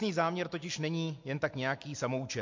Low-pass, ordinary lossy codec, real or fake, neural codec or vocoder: 7.2 kHz; MP3, 48 kbps; real; none